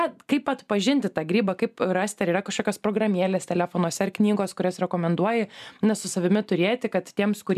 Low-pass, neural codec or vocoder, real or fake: 14.4 kHz; none; real